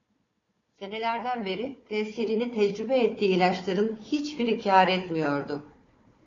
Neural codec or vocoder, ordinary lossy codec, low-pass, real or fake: codec, 16 kHz, 4 kbps, FunCodec, trained on Chinese and English, 50 frames a second; AAC, 32 kbps; 7.2 kHz; fake